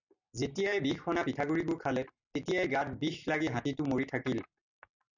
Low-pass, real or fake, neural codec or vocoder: 7.2 kHz; real; none